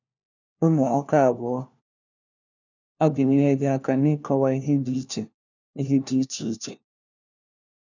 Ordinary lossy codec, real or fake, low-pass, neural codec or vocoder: none; fake; 7.2 kHz; codec, 16 kHz, 1 kbps, FunCodec, trained on LibriTTS, 50 frames a second